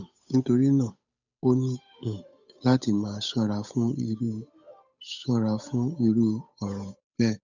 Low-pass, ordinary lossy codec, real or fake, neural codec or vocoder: 7.2 kHz; none; fake; codec, 16 kHz, 8 kbps, FunCodec, trained on Chinese and English, 25 frames a second